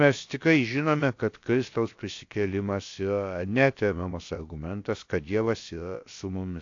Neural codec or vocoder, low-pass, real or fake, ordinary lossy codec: codec, 16 kHz, about 1 kbps, DyCAST, with the encoder's durations; 7.2 kHz; fake; AAC, 48 kbps